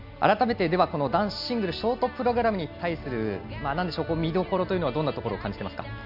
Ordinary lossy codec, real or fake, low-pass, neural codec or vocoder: none; real; 5.4 kHz; none